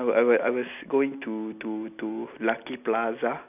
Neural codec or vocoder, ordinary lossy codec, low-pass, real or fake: none; none; 3.6 kHz; real